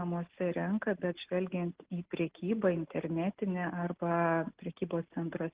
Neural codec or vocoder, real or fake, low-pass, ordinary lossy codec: none; real; 3.6 kHz; Opus, 16 kbps